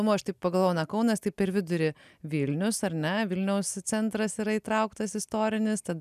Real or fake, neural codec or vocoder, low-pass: real; none; 14.4 kHz